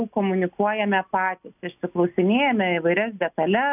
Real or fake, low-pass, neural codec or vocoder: real; 3.6 kHz; none